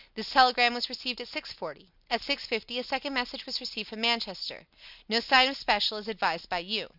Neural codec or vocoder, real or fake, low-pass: none; real; 5.4 kHz